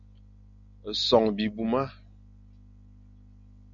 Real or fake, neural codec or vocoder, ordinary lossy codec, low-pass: real; none; MP3, 96 kbps; 7.2 kHz